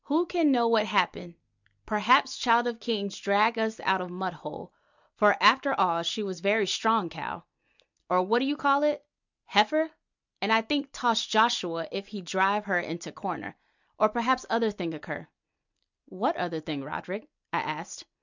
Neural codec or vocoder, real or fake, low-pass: none; real; 7.2 kHz